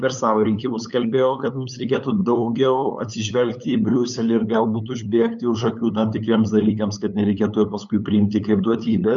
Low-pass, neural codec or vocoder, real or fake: 7.2 kHz; codec, 16 kHz, 8 kbps, FunCodec, trained on LibriTTS, 25 frames a second; fake